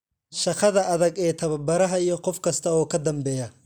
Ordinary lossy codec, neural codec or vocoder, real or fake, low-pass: none; none; real; none